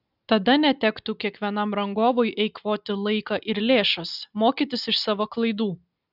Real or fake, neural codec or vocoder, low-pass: real; none; 5.4 kHz